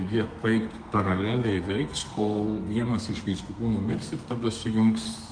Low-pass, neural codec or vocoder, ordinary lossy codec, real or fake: 9.9 kHz; codec, 32 kHz, 1.9 kbps, SNAC; Opus, 32 kbps; fake